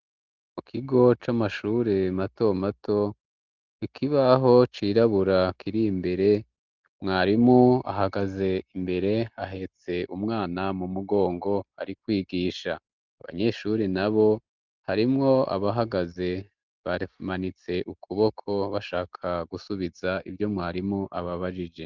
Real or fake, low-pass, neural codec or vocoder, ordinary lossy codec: real; 7.2 kHz; none; Opus, 32 kbps